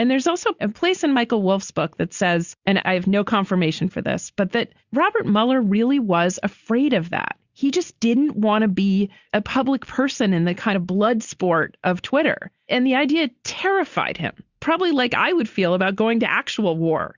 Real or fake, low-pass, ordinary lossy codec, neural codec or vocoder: real; 7.2 kHz; Opus, 64 kbps; none